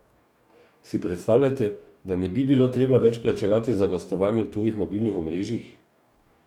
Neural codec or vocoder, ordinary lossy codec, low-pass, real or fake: codec, 44.1 kHz, 2.6 kbps, DAC; none; 19.8 kHz; fake